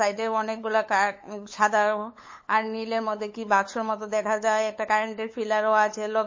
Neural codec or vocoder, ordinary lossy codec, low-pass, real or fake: codec, 16 kHz, 8 kbps, FunCodec, trained on LibriTTS, 25 frames a second; MP3, 32 kbps; 7.2 kHz; fake